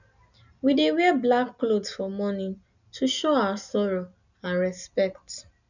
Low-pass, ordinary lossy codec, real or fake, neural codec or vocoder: 7.2 kHz; none; real; none